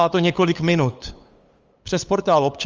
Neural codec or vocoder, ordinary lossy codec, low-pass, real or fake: codec, 16 kHz, 8 kbps, FunCodec, trained on LibriTTS, 25 frames a second; Opus, 32 kbps; 7.2 kHz; fake